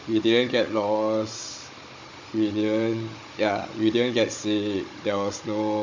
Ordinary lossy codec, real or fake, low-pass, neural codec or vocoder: MP3, 48 kbps; fake; 7.2 kHz; codec, 16 kHz, 16 kbps, FunCodec, trained on Chinese and English, 50 frames a second